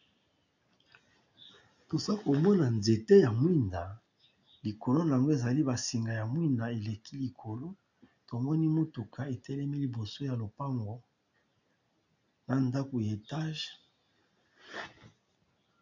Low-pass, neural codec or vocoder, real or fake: 7.2 kHz; none; real